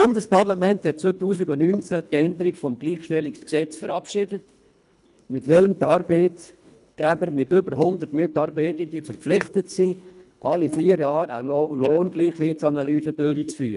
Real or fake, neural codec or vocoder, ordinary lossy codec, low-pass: fake; codec, 24 kHz, 1.5 kbps, HILCodec; none; 10.8 kHz